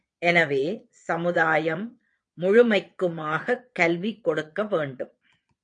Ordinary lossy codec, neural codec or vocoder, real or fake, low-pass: AAC, 48 kbps; vocoder, 22.05 kHz, 80 mel bands, Vocos; fake; 9.9 kHz